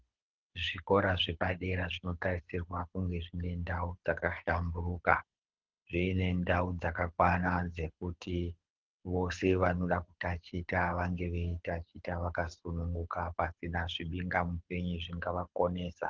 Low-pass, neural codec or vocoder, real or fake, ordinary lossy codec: 7.2 kHz; codec, 24 kHz, 6 kbps, HILCodec; fake; Opus, 16 kbps